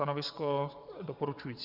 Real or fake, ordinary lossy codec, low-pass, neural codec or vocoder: fake; Opus, 64 kbps; 5.4 kHz; vocoder, 22.05 kHz, 80 mel bands, WaveNeXt